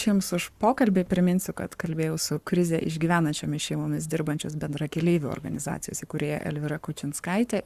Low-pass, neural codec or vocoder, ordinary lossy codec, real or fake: 14.4 kHz; codec, 44.1 kHz, 7.8 kbps, Pupu-Codec; Opus, 64 kbps; fake